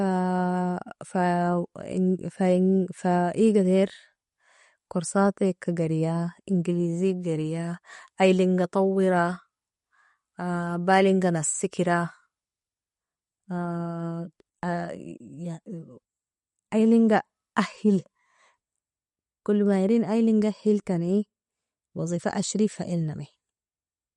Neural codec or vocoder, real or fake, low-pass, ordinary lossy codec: none; real; 19.8 kHz; MP3, 48 kbps